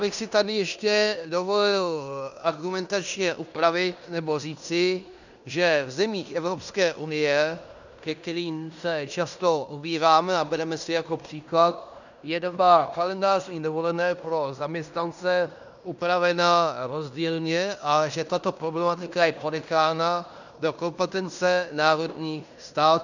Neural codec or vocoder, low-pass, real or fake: codec, 16 kHz in and 24 kHz out, 0.9 kbps, LongCat-Audio-Codec, fine tuned four codebook decoder; 7.2 kHz; fake